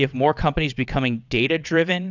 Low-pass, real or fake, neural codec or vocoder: 7.2 kHz; fake; vocoder, 22.05 kHz, 80 mel bands, WaveNeXt